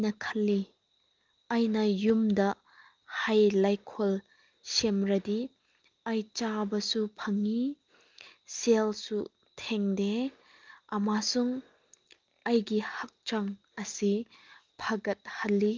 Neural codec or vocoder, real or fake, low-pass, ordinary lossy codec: none; real; 7.2 kHz; Opus, 32 kbps